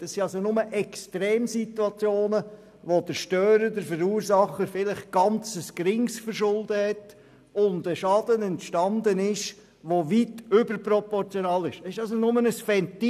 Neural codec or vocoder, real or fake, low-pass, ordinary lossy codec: none; real; 14.4 kHz; none